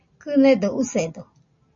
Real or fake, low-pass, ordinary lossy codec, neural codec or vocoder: real; 7.2 kHz; MP3, 32 kbps; none